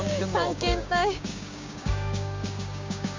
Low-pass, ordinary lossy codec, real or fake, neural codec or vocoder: 7.2 kHz; MP3, 64 kbps; fake; codec, 16 kHz, 6 kbps, DAC